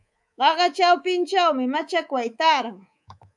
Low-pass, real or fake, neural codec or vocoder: 10.8 kHz; fake; codec, 24 kHz, 3.1 kbps, DualCodec